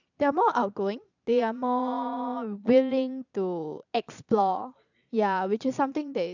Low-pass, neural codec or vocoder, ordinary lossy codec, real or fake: 7.2 kHz; vocoder, 22.05 kHz, 80 mel bands, Vocos; none; fake